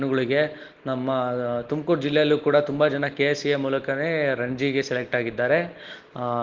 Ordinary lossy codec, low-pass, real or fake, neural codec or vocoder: Opus, 16 kbps; 7.2 kHz; real; none